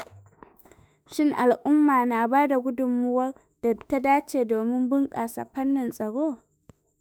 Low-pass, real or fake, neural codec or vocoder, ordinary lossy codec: none; fake; autoencoder, 48 kHz, 32 numbers a frame, DAC-VAE, trained on Japanese speech; none